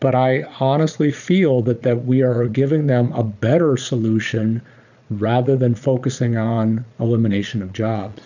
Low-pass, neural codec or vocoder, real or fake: 7.2 kHz; vocoder, 22.05 kHz, 80 mel bands, Vocos; fake